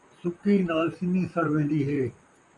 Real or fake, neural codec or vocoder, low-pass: fake; vocoder, 44.1 kHz, 128 mel bands, Pupu-Vocoder; 10.8 kHz